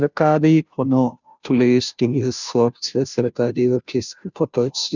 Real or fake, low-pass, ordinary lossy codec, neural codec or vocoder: fake; 7.2 kHz; none; codec, 16 kHz, 0.5 kbps, FunCodec, trained on Chinese and English, 25 frames a second